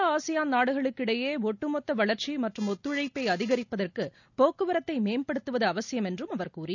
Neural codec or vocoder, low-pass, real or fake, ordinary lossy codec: none; 7.2 kHz; real; none